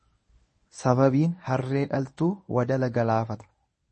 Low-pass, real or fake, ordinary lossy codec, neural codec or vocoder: 10.8 kHz; fake; MP3, 32 kbps; codec, 24 kHz, 0.9 kbps, WavTokenizer, medium speech release version 1